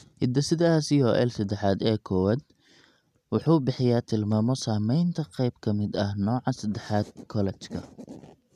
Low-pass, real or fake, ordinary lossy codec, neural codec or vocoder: 14.4 kHz; real; none; none